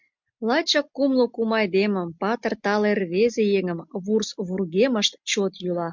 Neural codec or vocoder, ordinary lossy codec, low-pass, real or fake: none; MP3, 64 kbps; 7.2 kHz; real